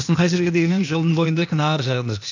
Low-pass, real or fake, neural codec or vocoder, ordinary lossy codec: 7.2 kHz; fake; codec, 16 kHz, 0.8 kbps, ZipCodec; none